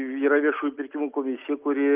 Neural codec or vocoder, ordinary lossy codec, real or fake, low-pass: none; Opus, 24 kbps; real; 3.6 kHz